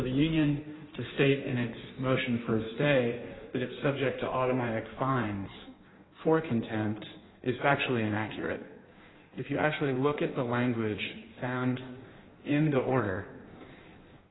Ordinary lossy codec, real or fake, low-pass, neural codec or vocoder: AAC, 16 kbps; fake; 7.2 kHz; codec, 16 kHz in and 24 kHz out, 1.1 kbps, FireRedTTS-2 codec